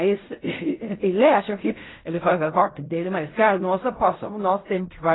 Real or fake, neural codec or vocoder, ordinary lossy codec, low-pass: fake; codec, 16 kHz in and 24 kHz out, 0.4 kbps, LongCat-Audio-Codec, fine tuned four codebook decoder; AAC, 16 kbps; 7.2 kHz